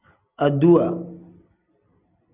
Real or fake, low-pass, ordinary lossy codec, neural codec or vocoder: real; 3.6 kHz; Opus, 64 kbps; none